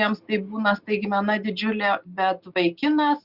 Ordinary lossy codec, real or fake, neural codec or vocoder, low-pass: Opus, 64 kbps; real; none; 5.4 kHz